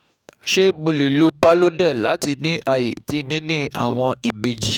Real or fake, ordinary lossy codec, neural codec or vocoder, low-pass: fake; none; codec, 44.1 kHz, 2.6 kbps, DAC; 19.8 kHz